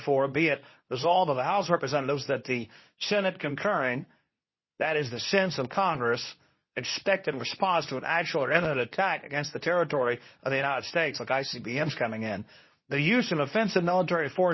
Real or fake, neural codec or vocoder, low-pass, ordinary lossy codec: fake; codec, 24 kHz, 0.9 kbps, WavTokenizer, medium speech release version 2; 7.2 kHz; MP3, 24 kbps